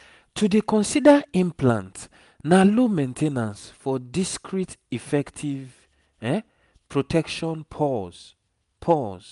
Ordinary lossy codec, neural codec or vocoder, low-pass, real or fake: none; none; 10.8 kHz; real